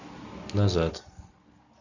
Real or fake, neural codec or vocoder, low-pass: real; none; 7.2 kHz